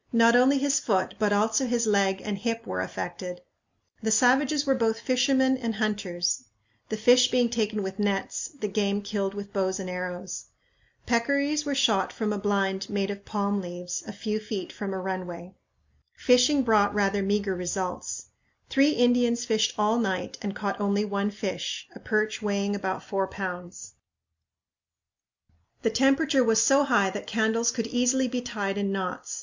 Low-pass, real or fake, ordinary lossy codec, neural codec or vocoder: 7.2 kHz; real; MP3, 64 kbps; none